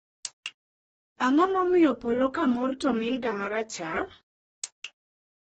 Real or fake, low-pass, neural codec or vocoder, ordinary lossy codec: fake; 19.8 kHz; codec, 44.1 kHz, 2.6 kbps, DAC; AAC, 24 kbps